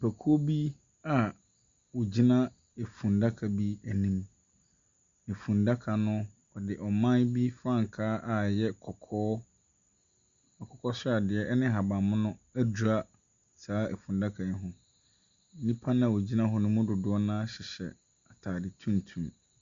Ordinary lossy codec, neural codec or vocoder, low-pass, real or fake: Opus, 64 kbps; none; 7.2 kHz; real